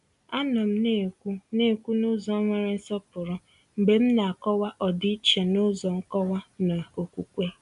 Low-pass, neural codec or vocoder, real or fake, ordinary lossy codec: 10.8 kHz; none; real; none